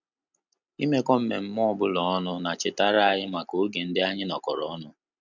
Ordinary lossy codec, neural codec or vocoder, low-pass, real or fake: none; none; 7.2 kHz; real